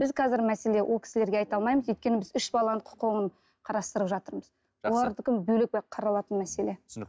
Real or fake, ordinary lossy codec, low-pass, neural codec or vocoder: real; none; none; none